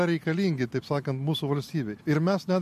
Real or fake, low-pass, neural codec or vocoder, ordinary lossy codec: real; 14.4 kHz; none; MP3, 64 kbps